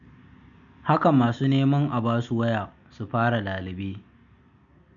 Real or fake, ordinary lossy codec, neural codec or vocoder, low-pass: real; none; none; 7.2 kHz